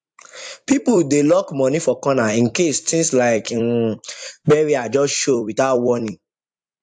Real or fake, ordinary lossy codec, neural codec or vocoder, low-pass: fake; AAC, 64 kbps; vocoder, 44.1 kHz, 128 mel bands every 256 samples, BigVGAN v2; 9.9 kHz